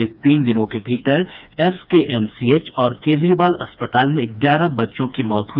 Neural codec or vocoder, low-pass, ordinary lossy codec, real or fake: codec, 16 kHz, 2 kbps, FreqCodec, smaller model; 5.4 kHz; none; fake